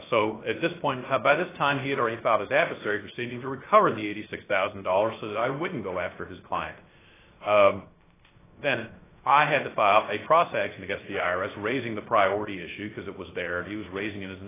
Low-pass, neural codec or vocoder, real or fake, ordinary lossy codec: 3.6 kHz; codec, 16 kHz, 0.3 kbps, FocalCodec; fake; AAC, 16 kbps